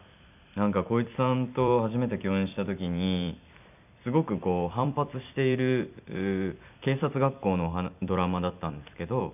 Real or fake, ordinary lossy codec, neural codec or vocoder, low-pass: fake; none; vocoder, 44.1 kHz, 128 mel bands every 256 samples, BigVGAN v2; 3.6 kHz